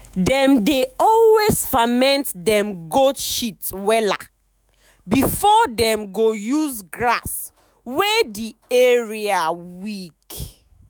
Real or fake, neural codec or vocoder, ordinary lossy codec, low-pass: fake; autoencoder, 48 kHz, 128 numbers a frame, DAC-VAE, trained on Japanese speech; none; none